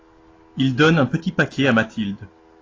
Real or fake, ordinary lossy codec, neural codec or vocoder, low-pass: real; AAC, 32 kbps; none; 7.2 kHz